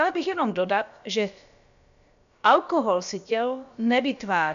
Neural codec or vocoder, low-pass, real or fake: codec, 16 kHz, about 1 kbps, DyCAST, with the encoder's durations; 7.2 kHz; fake